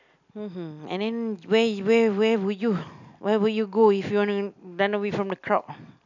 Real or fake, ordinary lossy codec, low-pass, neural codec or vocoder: real; none; 7.2 kHz; none